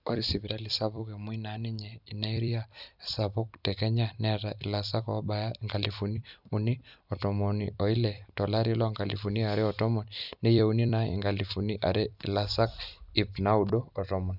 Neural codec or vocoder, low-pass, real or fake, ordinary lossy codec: vocoder, 44.1 kHz, 128 mel bands every 256 samples, BigVGAN v2; 5.4 kHz; fake; none